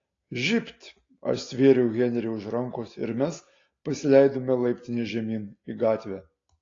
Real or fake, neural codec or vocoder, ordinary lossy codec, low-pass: real; none; AAC, 32 kbps; 7.2 kHz